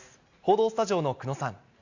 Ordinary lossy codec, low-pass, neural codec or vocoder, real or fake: Opus, 64 kbps; 7.2 kHz; none; real